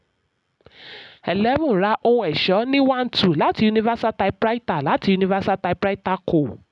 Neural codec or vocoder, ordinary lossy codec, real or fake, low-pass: none; none; real; 10.8 kHz